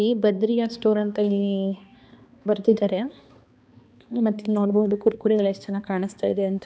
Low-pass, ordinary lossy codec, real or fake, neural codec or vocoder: none; none; fake; codec, 16 kHz, 2 kbps, X-Codec, HuBERT features, trained on balanced general audio